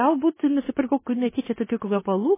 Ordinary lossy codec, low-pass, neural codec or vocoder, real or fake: MP3, 16 kbps; 3.6 kHz; codec, 16 kHz in and 24 kHz out, 0.9 kbps, LongCat-Audio-Codec, four codebook decoder; fake